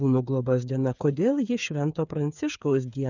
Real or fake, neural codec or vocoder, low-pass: fake; codec, 16 kHz, 8 kbps, FreqCodec, smaller model; 7.2 kHz